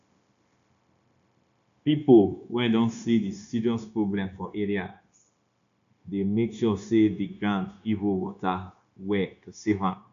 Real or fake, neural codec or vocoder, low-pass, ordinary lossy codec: fake; codec, 16 kHz, 0.9 kbps, LongCat-Audio-Codec; 7.2 kHz; none